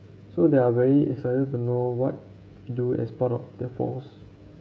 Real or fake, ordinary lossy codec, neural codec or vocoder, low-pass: fake; none; codec, 16 kHz, 16 kbps, FreqCodec, smaller model; none